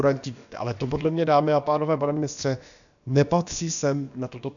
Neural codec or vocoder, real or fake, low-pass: codec, 16 kHz, about 1 kbps, DyCAST, with the encoder's durations; fake; 7.2 kHz